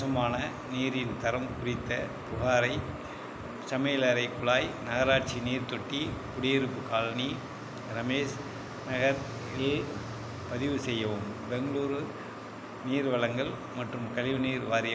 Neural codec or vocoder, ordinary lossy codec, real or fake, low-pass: none; none; real; none